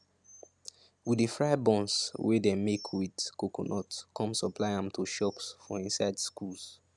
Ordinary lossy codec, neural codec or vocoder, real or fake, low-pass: none; none; real; none